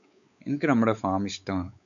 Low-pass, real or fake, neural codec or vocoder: 7.2 kHz; fake; codec, 16 kHz, 4 kbps, X-Codec, WavLM features, trained on Multilingual LibriSpeech